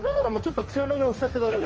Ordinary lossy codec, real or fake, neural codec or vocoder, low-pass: Opus, 24 kbps; fake; codec, 16 kHz, 1.1 kbps, Voila-Tokenizer; 7.2 kHz